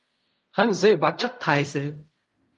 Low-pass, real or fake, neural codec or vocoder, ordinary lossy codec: 10.8 kHz; fake; codec, 16 kHz in and 24 kHz out, 0.4 kbps, LongCat-Audio-Codec, fine tuned four codebook decoder; Opus, 24 kbps